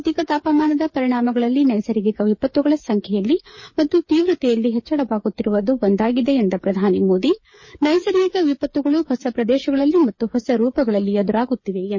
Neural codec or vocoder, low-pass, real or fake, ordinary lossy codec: codec, 16 kHz, 8 kbps, FreqCodec, smaller model; 7.2 kHz; fake; MP3, 32 kbps